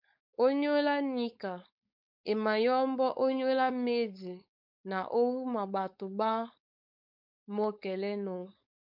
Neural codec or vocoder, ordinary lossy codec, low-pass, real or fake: codec, 16 kHz, 4.8 kbps, FACodec; MP3, 48 kbps; 5.4 kHz; fake